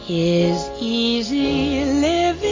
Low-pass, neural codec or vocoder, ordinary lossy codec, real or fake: 7.2 kHz; none; AAC, 32 kbps; real